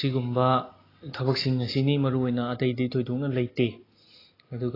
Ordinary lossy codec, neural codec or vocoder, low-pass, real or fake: AAC, 24 kbps; none; 5.4 kHz; real